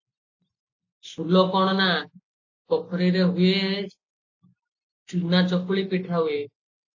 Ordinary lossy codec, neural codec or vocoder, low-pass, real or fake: AAC, 48 kbps; none; 7.2 kHz; real